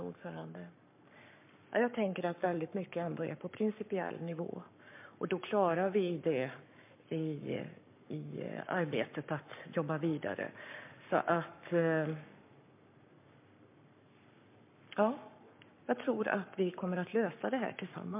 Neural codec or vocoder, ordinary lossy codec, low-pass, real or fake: codec, 44.1 kHz, 7.8 kbps, Pupu-Codec; MP3, 32 kbps; 3.6 kHz; fake